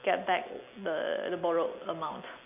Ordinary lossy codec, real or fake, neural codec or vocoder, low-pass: none; real; none; 3.6 kHz